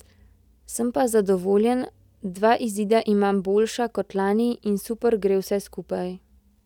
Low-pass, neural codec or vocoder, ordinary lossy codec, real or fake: 19.8 kHz; none; none; real